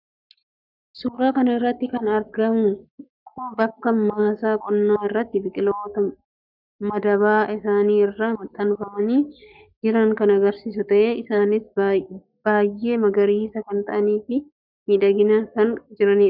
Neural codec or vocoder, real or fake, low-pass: codec, 44.1 kHz, 7.8 kbps, Pupu-Codec; fake; 5.4 kHz